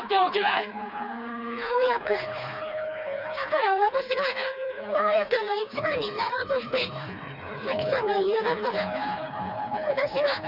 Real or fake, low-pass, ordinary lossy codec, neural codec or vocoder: fake; 5.4 kHz; none; codec, 16 kHz, 2 kbps, FreqCodec, smaller model